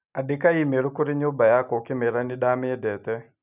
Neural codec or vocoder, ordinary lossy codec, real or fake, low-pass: none; none; real; 3.6 kHz